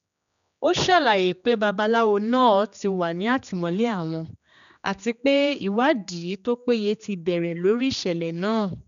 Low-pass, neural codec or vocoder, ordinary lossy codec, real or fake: 7.2 kHz; codec, 16 kHz, 2 kbps, X-Codec, HuBERT features, trained on general audio; none; fake